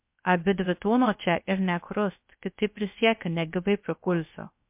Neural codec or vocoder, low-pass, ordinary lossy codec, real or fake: codec, 16 kHz, 0.3 kbps, FocalCodec; 3.6 kHz; MP3, 32 kbps; fake